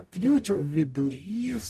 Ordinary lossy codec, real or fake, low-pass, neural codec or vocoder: MP3, 96 kbps; fake; 14.4 kHz; codec, 44.1 kHz, 0.9 kbps, DAC